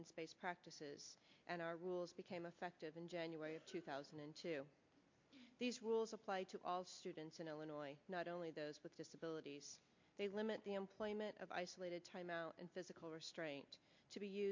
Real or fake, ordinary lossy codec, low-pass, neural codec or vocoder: real; MP3, 48 kbps; 7.2 kHz; none